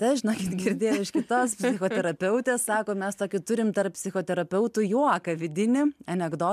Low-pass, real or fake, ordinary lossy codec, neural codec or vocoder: 14.4 kHz; real; MP3, 96 kbps; none